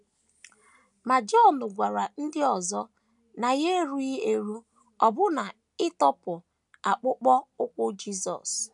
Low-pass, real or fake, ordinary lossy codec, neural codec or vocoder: 10.8 kHz; real; none; none